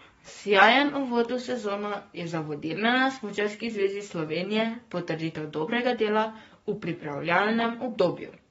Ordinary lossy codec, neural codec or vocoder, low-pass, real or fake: AAC, 24 kbps; codec, 44.1 kHz, 7.8 kbps, Pupu-Codec; 19.8 kHz; fake